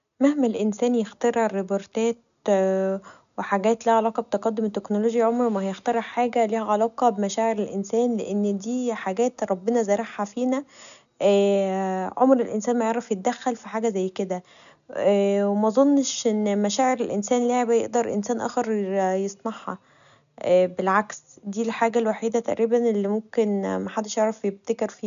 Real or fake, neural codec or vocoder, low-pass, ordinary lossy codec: real; none; 7.2 kHz; none